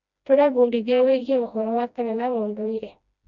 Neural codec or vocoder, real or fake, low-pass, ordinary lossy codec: codec, 16 kHz, 1 kbps, FreqCodec, smaller model; fake; 7.2 kHz; none